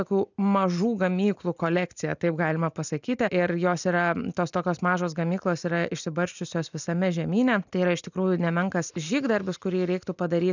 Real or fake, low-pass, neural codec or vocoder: real; 7.2 kHz; none